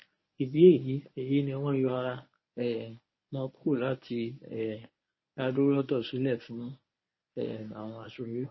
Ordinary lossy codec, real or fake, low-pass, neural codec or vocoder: MP3, 24 kbps; fake; 7.2 kHz; codec, 24 kHz, 0.9 kbps, WavTokenizer, medium speech release version 1